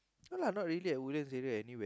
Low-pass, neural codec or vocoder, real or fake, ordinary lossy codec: none; none; real; none